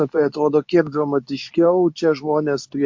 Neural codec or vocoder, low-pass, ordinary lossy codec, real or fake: codec, 24 kHz, 0.9 kbps, WavTokenizer, medium speech release version 2; 7.2 kHz; MP3, 48 kbps; fake